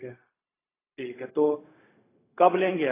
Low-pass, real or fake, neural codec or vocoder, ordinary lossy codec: 3.6 kHz; fake; codec, 16 kHz, 0.4 kbps, LongCat-Audio-Codec; AAC, 16 kbps